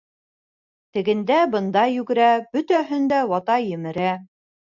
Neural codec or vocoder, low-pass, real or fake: none; 7.2 kHz; real